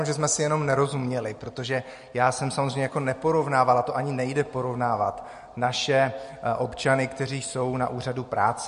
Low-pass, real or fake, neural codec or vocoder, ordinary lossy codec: 10.8 kHz; real; none; MP3, 48 kbps